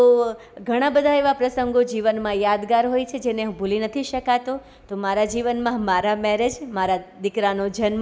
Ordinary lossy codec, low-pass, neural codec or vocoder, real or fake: none; none; none; real